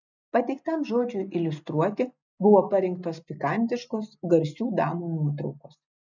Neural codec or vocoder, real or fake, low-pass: none; real; 7.2 kHz